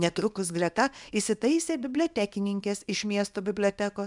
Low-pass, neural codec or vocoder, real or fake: 10.8 kHz; codec, 24 kHz, 0.9 kbps, WavTokenizer, small release; fake